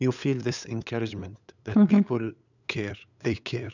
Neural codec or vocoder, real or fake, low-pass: codec, 16 kHz, 8 kbps, FunCodec, trained on LibriTTS, 25 frames a second; fake; 7.2 kHz